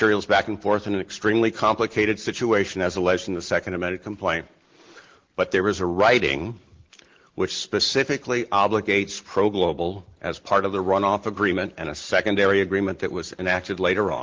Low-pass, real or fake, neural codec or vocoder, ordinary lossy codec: 7.2 kHz; real; none; Opus, 16 kbps